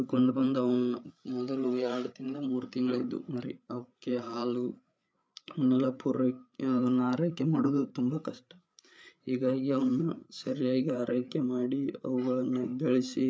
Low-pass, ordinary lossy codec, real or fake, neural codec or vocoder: none; none; fake; codec, 16 kHz, 8 kbps, FreqCodec, larger model